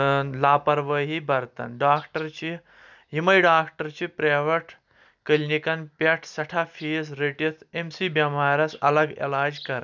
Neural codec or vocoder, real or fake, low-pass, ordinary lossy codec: none; real; 7.2 kHz; none